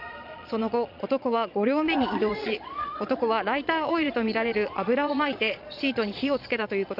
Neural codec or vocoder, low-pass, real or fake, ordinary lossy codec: vocoder, 22.05 kHz, 80 mel bands, Vocos; 5.4 kHz; fake; none